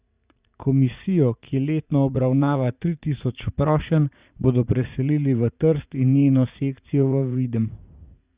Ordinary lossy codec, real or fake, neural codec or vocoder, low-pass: Opus, 64 kbps; fake; vocoder, 44.1 kHz, 80 mel bands, Vocos; 3.6 kHz